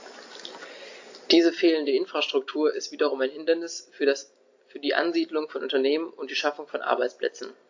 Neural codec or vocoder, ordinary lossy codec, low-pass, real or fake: none; AAC, 48 kbps; 7.2 kHz; real